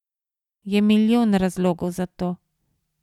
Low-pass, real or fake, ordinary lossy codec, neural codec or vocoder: 19.8 kHz; real; none; none